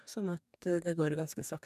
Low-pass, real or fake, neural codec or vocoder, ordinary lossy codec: 14.4 kHz; fake; codec, 44.1 kHz, 2.6 kbps, DAC; none